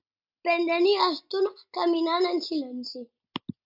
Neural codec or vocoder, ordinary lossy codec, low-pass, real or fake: none; MP3, 48 kbps; 5.4 kHz; real